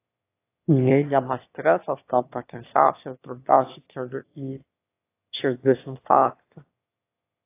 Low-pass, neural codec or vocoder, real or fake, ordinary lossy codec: 3.6 kHz; autoencoder, 22.05 kHz, a latent of 192 numbers a frame, VITS, trained on one speaker; fake; AAC, 24 kbps